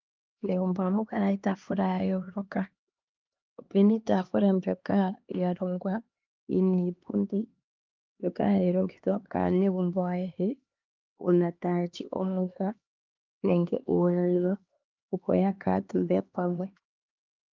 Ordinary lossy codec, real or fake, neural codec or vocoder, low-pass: Opus, 24 kbps; fake; codec, 16 kHz, 2 kbps, X-Codec, HuBERT features, trained on LibriSpeech; 7.2 kHz